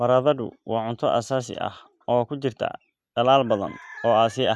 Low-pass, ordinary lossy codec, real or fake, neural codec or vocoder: none; none; real; none